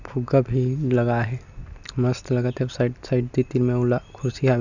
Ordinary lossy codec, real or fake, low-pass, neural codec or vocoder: none; real; 7.2 kHz; none